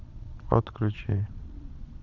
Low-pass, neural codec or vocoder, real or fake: 7.2 kHz; vocoder, 22.05 kHz, 80 mel bands, Vocos; fake